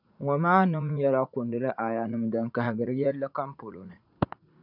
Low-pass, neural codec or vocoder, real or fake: 5.4 kHz; vocoder, 44.1 kHz, 80 mel bands, Vocos; fake